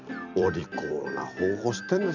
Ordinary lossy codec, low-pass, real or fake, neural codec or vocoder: none; 7.2 kHz; fake; vocoder, 44.1 kHz, 128 mel bands every 256 samples, BigVGAN v2